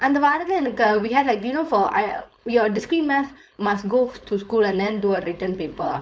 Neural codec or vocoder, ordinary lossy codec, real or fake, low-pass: codec, 16 kHz, 4.8 kbps, FACodec; none; fake; none